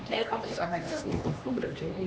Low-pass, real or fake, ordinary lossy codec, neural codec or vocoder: none; fake; none; codec, 16 kHz, 2 kbps, X-Codec, HuBERT features, trained on LibriSpeech